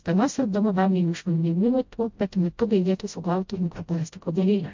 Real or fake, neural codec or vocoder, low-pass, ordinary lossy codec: fake; codec, 16 kHz, 0.5 kbps, FreqCodec, smaller model; 7.2 kHz; MP3, 48 kbps